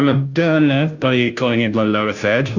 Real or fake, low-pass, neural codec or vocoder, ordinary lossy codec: fake; 7.2 kHz; codec, 16 kHz, 0.5 kbps, FunCodec, trained on Chinese and English, 25 frames a second; Opus, 64 kbps